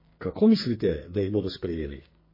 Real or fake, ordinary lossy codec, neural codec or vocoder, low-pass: fake; MP3, 24 kbps; codec, 44.1 kHz, 2.6 kbps, SNAC; 5.4 kHz